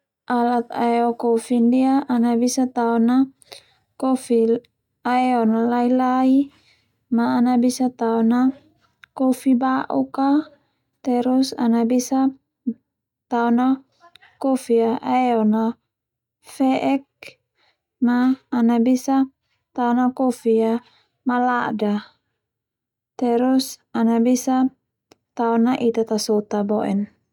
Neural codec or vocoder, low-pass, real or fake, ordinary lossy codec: none; 19.8 kHz; real; none